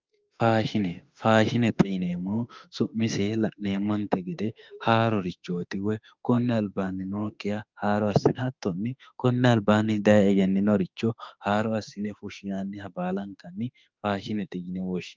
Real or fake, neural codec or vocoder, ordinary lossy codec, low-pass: fake; autoencoder, 48 kHz, 32 numbers a frame, DAC-VAE, trained on Japanese speech; Opus, 24 kbps; 7.2 kHz